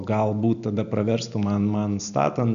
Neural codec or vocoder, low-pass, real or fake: none; 7.2 kHz; real